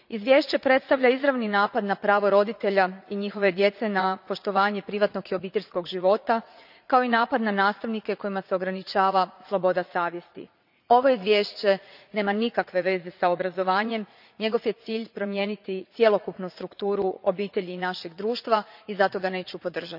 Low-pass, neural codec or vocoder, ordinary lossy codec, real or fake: 5.4 kHz; vocoder, 44.1 kHz, 80 mel bands, Vocos; none; fake